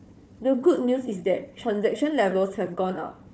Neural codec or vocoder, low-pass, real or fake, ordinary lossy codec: codec, 16 kHz, 4 kbps, FunCodec, trained on Chinese and English, 50 frames a second; none; fake; none